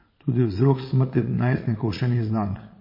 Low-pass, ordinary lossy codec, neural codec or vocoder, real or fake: 5.4 kHz; MP3, 24 kbps; vocoder, 22.05 kHz, 80 mel bands, Vocos; fake